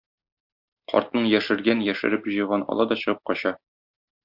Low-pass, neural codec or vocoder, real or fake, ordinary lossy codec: 5.4 kHz; none; real; Opus, 64 kbps